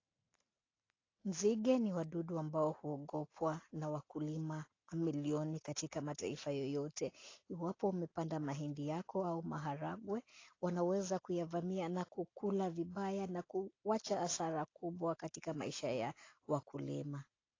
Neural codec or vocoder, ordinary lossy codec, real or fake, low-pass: none; AAC, 32 kbps; real; 7.2 kHz